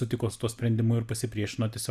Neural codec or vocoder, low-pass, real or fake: none; 14.4 kHz; real